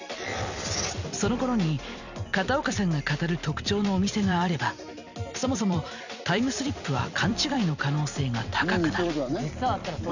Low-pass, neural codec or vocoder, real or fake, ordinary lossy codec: 7.2 kHz; vocoder, 44.1 kHz, 128 mel bands every 256 samples, BigVGAN v2; fake; none